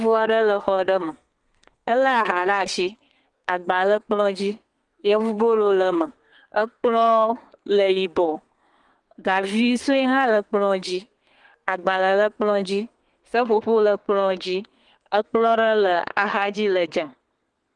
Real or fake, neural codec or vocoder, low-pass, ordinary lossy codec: fake; codec, 32 kHz, 1.9 kbps, SNAC; 10.8 kHz; Opus, 24 kbps